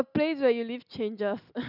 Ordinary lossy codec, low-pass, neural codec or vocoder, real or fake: none; 5.4 kHz; none; real